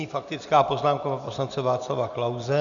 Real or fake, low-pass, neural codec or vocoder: real; 7.2 kHz; none